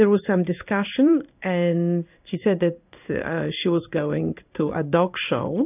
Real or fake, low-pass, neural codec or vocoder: fake; 3.6 kHz; codec, 16 kHz in and 24 kHz out, 1 kbps, XY-Tokenizer